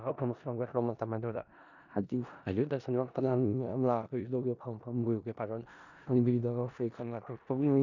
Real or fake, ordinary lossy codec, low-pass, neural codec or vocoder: fake; none; 7.2 kHz; codec, 16 kHz in and 24 kHz out, 0.4 kbps, LongCat-Audio-Codec, four codebook decoder